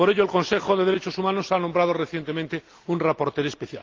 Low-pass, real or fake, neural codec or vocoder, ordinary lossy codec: 7.2 kHz; real; none; Opus, 32 kbps